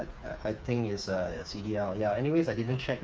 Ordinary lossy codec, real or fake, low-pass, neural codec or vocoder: none; fake; none; codec, 16 kHz, 4 kbps, FreqCodec, smaller model